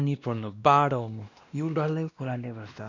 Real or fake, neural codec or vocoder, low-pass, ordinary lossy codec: fake; codec, 16 kHz, 1 kbps, X-Codec, WavLM features, trained on Multilingual LibriSpeech; 7.2 kHz; none